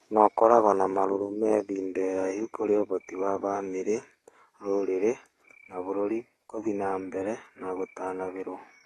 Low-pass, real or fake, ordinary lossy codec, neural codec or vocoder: 19.8 kHz; fake; AAC, 32 kbps; codec, 44.1 kHz, 7.8 kbps, DAC